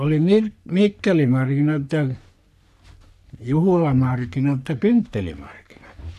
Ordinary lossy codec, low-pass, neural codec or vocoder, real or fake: none; 14.4 kHz; codec, 44.1 kHz, 3.4 kbps, Pupu-Codec; fake